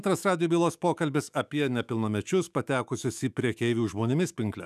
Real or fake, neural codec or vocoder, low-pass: fake; autoencoder, 48 kHz, 128 numbers a frame, DAC-VAE, trained on Japanese speech; 14.4 kHz